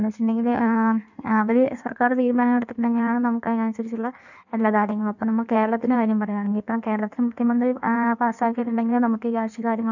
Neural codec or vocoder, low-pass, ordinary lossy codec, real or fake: codec, 16 kHz in and 24 kHz out, 1.1 kbps, FireRedTTS-2 codec; 7.2 kHz; none; fake